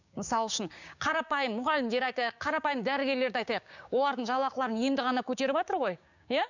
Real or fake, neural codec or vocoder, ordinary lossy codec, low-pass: fake; codec, 16 kHz, 6 kbps, DAC; none; 7.2 kHz